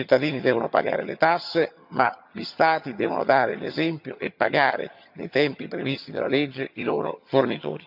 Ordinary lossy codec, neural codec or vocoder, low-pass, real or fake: none; vocoder, 22.05 kHz, 80 mel bands, HiFi-GAN; 5.4 kHz; fake